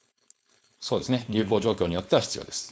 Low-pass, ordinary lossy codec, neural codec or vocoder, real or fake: none; none; codec, 16 kHz, 4.8 kbps, FACodec; fake